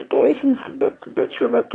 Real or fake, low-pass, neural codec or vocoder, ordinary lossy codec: fake; 9.9 kHz; autoencoder, 22.05 kHz, a latent of 192 numbers a frame, VITS, trained on one speaker; AAC, 48 kbps